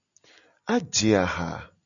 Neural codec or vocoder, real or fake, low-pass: none; real; 7.2 kHz